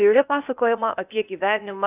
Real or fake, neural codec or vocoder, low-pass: fake; codec, 16 kHz, 0.8 kbps, ZipCodec; 3.6 kHz